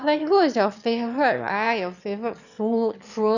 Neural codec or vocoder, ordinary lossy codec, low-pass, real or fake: autoencoder, 22.05 kHz, a latent of 192 numbers a frame, VITS, trained on one speaker; none; 7.2 kHz; fake